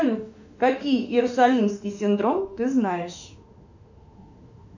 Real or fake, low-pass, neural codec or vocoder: fake; 7.2 kHz; autoencoder, 48 kHz, 32 numbers a frame, DAC-VAE, trained on Japanese speech